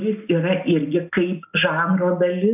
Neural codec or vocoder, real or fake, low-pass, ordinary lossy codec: none; real; 3.6 kHz; AAC, 32 kbps